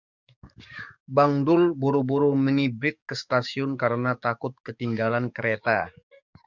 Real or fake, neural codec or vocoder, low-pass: fake; codec, 44.1 kHz, 7.8 kbps, DAC; 7.2 kHz